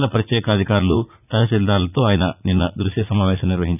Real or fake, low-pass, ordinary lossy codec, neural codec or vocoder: fake; 3.6 kHz; none; vocoder, 44.1 kHz, 80 mel bands, Vocos